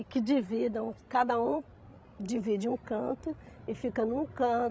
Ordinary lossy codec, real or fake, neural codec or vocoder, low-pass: none; fake; codec, 16 kHz, 16 kbps, FreqCodec, larger model; none